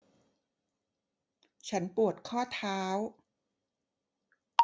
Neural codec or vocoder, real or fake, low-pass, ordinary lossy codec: none; real; none; none